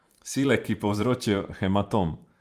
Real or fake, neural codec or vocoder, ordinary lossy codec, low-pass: fake; vocoder, 44.1 kHz, 128 mel bands every 512 samples, BigVGAN v2; Opus, 32 kbps; 14.4 kHz